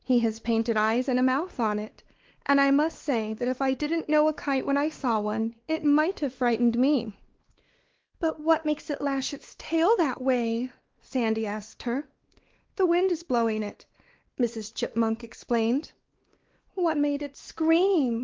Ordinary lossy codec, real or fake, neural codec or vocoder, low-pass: Opus, 16 kbps; fake; codec, 16 kHz, 2 kbps, X-Codec, WavLM features, trained on Multilingual LibriSpeech; 7.2 kHz